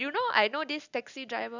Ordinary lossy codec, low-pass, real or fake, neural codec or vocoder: none; 7.2 kHz; real; none